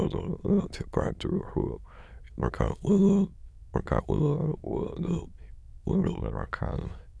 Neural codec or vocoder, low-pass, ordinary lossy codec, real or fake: autoencoder, 22.05 kHz, a latent of 192 numbers a frame, VITS, trained on many speakers; none; none; fake